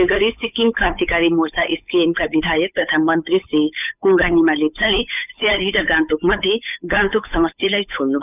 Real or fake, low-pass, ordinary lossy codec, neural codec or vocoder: fake; 3.6 kHz; none; codec, 16 kHz, 8 kbps, FunCodec, trained on Chinese and English, 25 frames a second